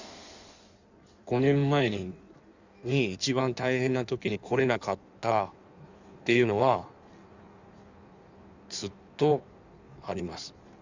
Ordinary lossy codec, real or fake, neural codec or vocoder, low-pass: Opus, 64 kbps; fake; codec, 16 kHz in and 24 kHz out, 1.1 kbps, FireRedTTS-2 codec; 7.2 kHz